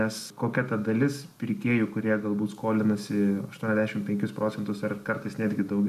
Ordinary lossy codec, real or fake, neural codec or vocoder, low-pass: AAC, 96 kbps; real; none; 14.4 kHz